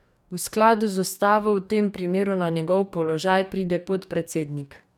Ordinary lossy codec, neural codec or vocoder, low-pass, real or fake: none; codec, 44.1 kHz, 2.6 kbps, DAC; 19.8 kHz; fake